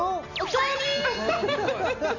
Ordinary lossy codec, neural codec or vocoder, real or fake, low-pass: none; none; real; 7.2 kHz